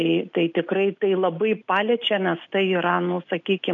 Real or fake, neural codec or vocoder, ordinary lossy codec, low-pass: real; none; MP3, 96 kbps; 7.2 kHz